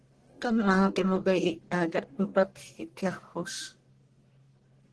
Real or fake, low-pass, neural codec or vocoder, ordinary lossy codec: fake; 10.8 kHz; codec, 44.1 kHz, 1.7 kbps, Pupu-Codec; Opus, 16 kbps